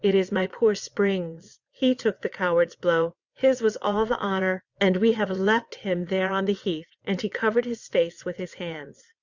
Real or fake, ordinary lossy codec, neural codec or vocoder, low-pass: fake; Opus, 64 kbps; vocoder, 22.05 kHz, 80 mel bands, WaveNeXt; 7.2 kHz